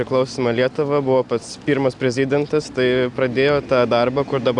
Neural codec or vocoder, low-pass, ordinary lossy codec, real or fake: none; 10.8 kHz; Opus, 64 kbps; real